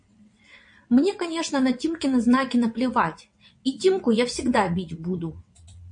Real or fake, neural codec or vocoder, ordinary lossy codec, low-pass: fake; vocoder, 22.05 kHz, 80 mel bands, WaveNeXt; MP3, 48 kbps; 9.9 kHz